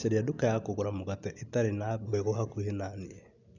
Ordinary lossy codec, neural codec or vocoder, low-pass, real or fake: none; none; 7.2 kHz; real